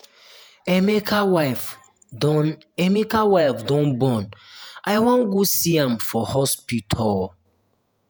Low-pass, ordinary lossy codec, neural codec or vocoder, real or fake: none; none; vocoder, 48 kHz, 128 mel bands, Vocos; fake